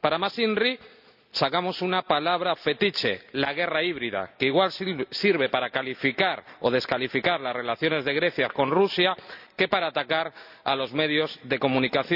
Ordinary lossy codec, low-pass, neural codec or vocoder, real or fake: none; 5.4 kHz; none; real